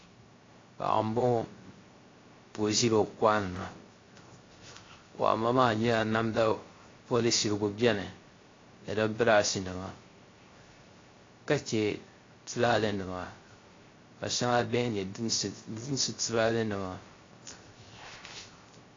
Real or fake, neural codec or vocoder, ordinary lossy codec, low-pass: fake; codec, 16 kHz, 0.3 kbps, FocalCodec; AAC, 32 kbps; 7.2 kHz